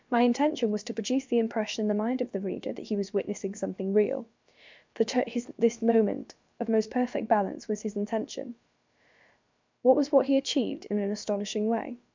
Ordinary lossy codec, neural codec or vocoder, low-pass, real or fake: MP3, 64 kbps; codec, 16 kHz, about 1 kbps, DyCAST, with the encoder's durations; 7.2 kHz; fake